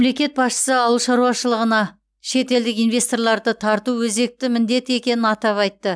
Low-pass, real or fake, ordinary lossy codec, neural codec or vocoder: none; real; none; none